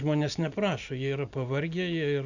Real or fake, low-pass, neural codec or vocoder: real; 7.2 kHz; none